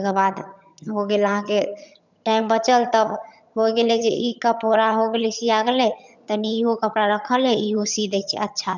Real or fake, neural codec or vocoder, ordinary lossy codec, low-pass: fake; vocoder, 22.05 kHz, 80 mel bands, HiFi-GAN; none; 7.2 kHz